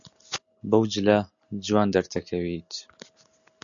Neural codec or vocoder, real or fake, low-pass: none; real; 7.2 kHz